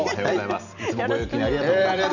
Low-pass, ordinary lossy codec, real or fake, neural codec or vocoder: 7.2 kHz; none; real; none